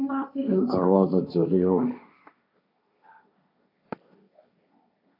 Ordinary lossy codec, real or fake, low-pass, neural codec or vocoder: AAC, 32 kbps; fake; 5.4 kHz; codec, 16 kHz, 1.1 kbps, Voila-Tokenizer